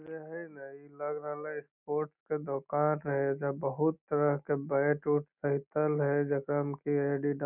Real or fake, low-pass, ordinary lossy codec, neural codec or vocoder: real; 3.6 kHz; none; none